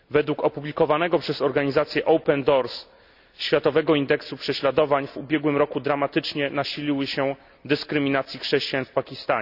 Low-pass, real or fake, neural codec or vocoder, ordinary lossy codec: 5.4 kHz; real; none; none